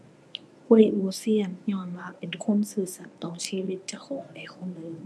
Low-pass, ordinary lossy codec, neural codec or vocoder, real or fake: none; none; codec, 24 kHz, 0.9 kbps, WavTokenizer, medium speech release version 1; fake